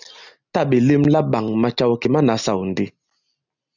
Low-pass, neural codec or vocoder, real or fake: 7.2 kHz; none; real